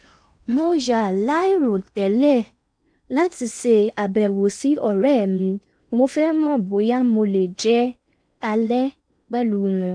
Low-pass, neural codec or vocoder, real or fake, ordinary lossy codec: 9.9 kHz; codec, 16 kHz in and 24 kHz out, 0.8 kbps, FocalCodec, streaming, 65536 codes; fake; none